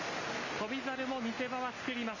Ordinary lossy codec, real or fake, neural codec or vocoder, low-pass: none; real; none; 7.2 kHz